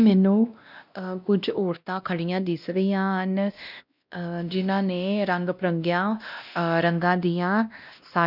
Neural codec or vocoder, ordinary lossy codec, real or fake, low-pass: codec, 16 kHz, 1 kbps, X-Codec, WavLM features, trained on Multilingual LibriSpeech; none; fake; 5.4 kHz